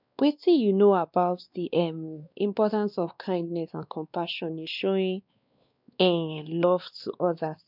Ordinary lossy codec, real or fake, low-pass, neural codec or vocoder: none; fake; 5.4 kHz; codec, 16 kHz, 2 kbps, X-Codec, WavLM features, trained on Multilingual LibriSpeech